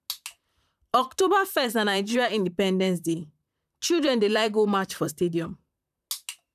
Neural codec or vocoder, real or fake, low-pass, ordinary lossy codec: vocoder, 44.1 kHz, 128 mel bands, Pupu-Vocoder; fake; 14.4 kHz; none